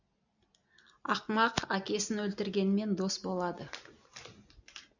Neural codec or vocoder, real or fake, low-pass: none; real; 7.2 kHz